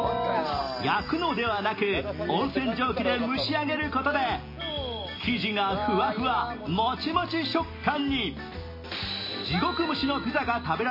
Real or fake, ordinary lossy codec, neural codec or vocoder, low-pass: real; MP3, 24 kbps; none; 5.4 kHz